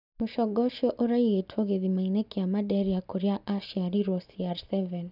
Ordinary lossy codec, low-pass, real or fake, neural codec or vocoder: none; 5.4 kHz; real; none